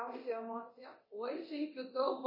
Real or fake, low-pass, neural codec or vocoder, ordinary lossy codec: fake; 5.4 kHz; codec, 24 kHz, 0.9 kbps, DualCodec; MP3, 24 kbps